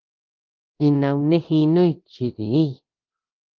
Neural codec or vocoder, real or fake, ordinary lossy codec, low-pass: codec, 24 kHz, 0.9 kbps, DualCodec; fake; Opus, 32 kbps; 7.2 kHz